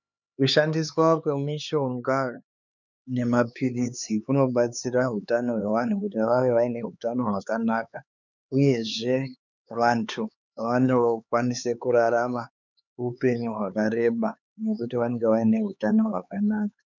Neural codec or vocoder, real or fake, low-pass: codec, 16 kHz, 4 kbps, X-Codec, HuBERT features, trained on LibriSpeech; fake; 7.2 kHz